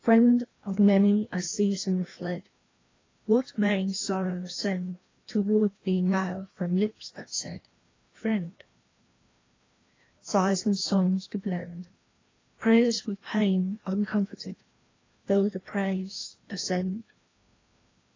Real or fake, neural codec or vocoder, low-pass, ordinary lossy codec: fake; codec, 16 kHz, 1 kbps, FreqCodec, larger model; 7.2 kHz; AAC, 32 kbps